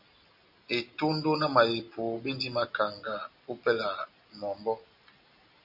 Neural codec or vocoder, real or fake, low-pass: none; real; 5.4 kHz